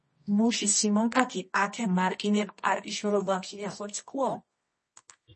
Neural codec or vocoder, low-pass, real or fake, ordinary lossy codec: codec, 24 kHz, 0.9 kbps, WavTokenizer, medium music audio release; 10.8 kHz; fake; MP3, 32 kbps